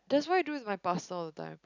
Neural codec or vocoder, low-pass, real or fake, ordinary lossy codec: none; 7.2 kHz; real; none